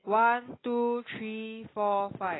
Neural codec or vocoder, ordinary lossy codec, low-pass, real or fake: none; AAC, 16 kbps; 7.2 kHz; real